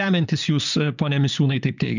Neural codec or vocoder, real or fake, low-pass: vocoder, 44.1 kHz, 128 mel bands, Pupu-Vocoder; fake; 7.2 kHz